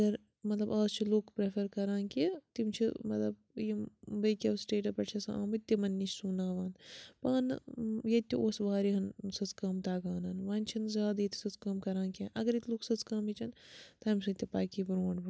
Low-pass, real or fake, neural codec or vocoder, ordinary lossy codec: none; real; none; none